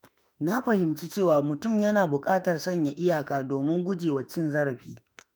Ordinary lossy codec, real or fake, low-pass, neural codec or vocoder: none; fake; none; autoencoder, 48 kHz, 32 numbers a frame, DAC-VAE, trained on Japanese speech